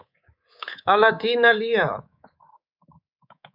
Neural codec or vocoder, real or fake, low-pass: codec, 24 kHz, 3.1 kbps, DualCodec; fake; 5.4 kHz